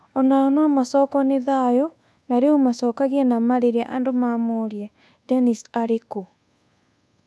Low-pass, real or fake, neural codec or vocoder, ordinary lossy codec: none; fake; codec, 24 kHz, 1.2 kbps, DualCodec; none